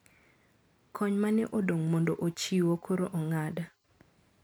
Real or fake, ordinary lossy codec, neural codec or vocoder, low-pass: real; none; none; none